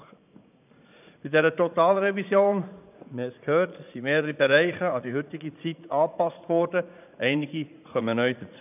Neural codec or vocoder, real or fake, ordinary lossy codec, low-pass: vocoder, 44.1 kHz, 80 mel bands, Vocos; fake; none; 3.6 kHz